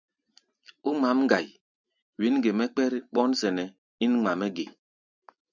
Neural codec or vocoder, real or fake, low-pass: none; real; 7.2 kHz